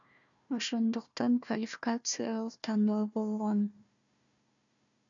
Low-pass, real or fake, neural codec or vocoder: 7.2 kHz; fake; codec, 16 kHz, 1 kbps, FunCodec, trained on Chinese and English, 50 frames a second